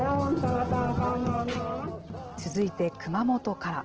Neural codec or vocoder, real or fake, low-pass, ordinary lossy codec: none; real; 7.2 kHz; Opus, 16 kbps